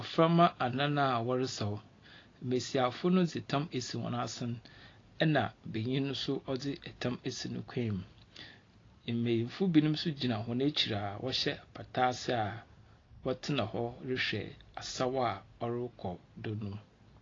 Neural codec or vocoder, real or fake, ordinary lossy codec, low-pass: none; real; AAC, 32 kbps; 7.2 kHz